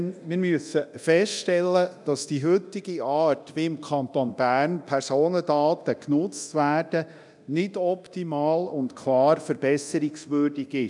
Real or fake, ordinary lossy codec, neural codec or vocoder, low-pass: fake; none; codec, 24 kHz, 0.9 kbps, DualCodec; 10.8 kHz